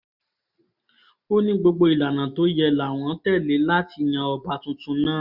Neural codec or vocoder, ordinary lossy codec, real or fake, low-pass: none; none; real; 5.4 kHz